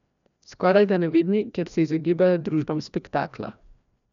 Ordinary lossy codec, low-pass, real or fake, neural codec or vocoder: none; 7.2 kHz; fake; codec, 16 kHz, 1 kbps, FreqCodec, larger model